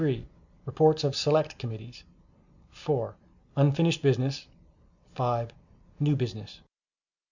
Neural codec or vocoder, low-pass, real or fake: none; 7.2 kHz; real